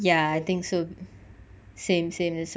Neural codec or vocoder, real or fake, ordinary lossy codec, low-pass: none; real; none; none